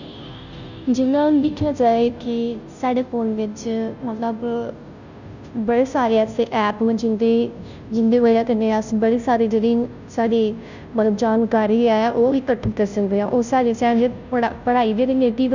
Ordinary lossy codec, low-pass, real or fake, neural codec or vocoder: none; 7.2 kHz; fake; codec, 16 kHz, 0.5 kbps, FunCodec, trained on Chinese and English, 25 frames a second